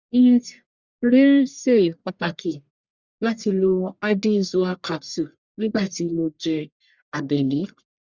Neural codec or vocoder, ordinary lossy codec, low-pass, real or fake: codec, 44.1 kHz, 1.7 kbps, Pupu-Codec; Opus, 64 kbps; 7.2 kHz; fake